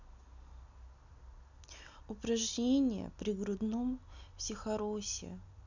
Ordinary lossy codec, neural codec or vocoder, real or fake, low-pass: none; none; real; 7.2 kHz